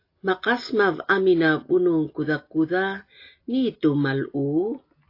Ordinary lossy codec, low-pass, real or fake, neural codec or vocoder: AAC, 32 kbps; 5.4 kHz; real; none